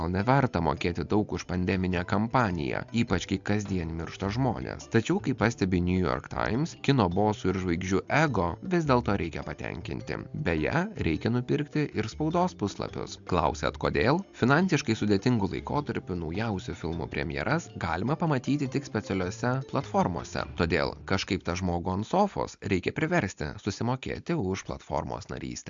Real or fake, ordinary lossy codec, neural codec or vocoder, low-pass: real; MP3, 64 kbps; none; 7.2 kHz